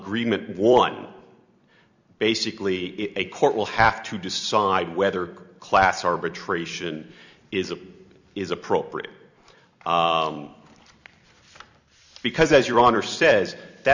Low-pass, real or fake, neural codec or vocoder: 7.2 kHz; fake; vocoder, 44.1 kHz, 128 mel bands every 256 samples, BigVGAN v2